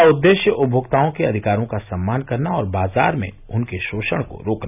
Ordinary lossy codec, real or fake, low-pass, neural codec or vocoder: none; real; 3.6 kHz; none